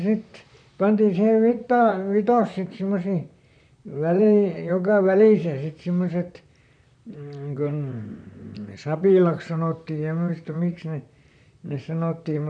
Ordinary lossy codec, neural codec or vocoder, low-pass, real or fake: none; vocoder, 44.1 kHz, 128 mel bands, Pupu-Vocoder; 9.9 kHz; fake